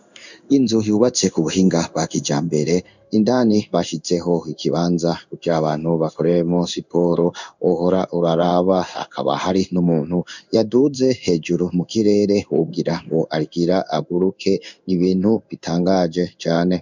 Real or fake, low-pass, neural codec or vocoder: fake; 7.2 kHz; codec, 16 kHz in and 24 kHz out, 1 kbps, XY-Tokenizer